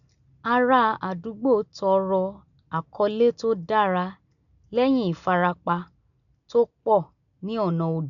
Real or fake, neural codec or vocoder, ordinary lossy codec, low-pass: real; none; none; 7.2 kHz